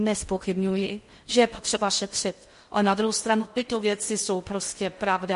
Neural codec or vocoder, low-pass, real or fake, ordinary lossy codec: codec, 16 kHz in and 24 kHz out, 0.6 kbps, FocalCodec, streaming, 4096 codes; 10.8 kHz; fake; MP3, 48 kbps